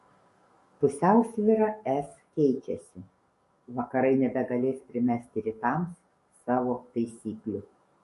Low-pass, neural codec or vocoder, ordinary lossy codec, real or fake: 14.4 kHz; codec, 44.1 kHz, 7.8 kbps, DAC; MP3, 48 kbps; fake